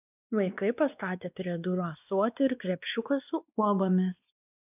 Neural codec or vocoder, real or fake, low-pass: codec, 16 kHz, 2 kbps, X-Codec, WavLM features, trained on Multilingual LibriSpeech; fake; 3.6 kHz